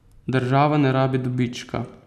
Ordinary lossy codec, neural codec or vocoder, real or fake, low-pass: none; none; real; 14.4 kHz